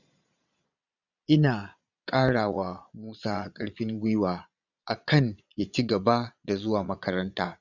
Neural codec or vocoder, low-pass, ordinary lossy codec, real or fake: vocoder, 22.05 kHz, 80 mel bands, Vocos; 7.2 kHz; none; fake